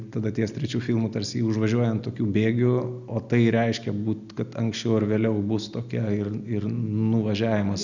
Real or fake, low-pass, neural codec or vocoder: real; 7.2 kHz; none